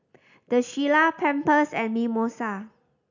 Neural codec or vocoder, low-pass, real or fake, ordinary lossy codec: none; 7.2 kHz; real; none